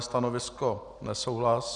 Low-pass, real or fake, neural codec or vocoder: 10.8 kHz; real; none